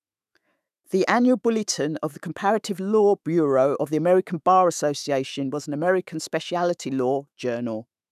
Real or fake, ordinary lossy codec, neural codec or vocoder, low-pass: fake; none; autoencoder, 48 kHz, 128 numbers a frame, DAC-VAE, trained on Japanese speech; 14.4 kHz